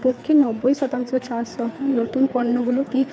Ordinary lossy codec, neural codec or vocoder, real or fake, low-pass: none; codec, 16 kHz, 4 kbps, FreqCodec, larger model; fake; none